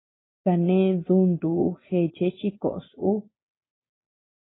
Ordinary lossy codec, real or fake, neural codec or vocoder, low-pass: AAC, 16 kbps; real; none; 7.2 kHz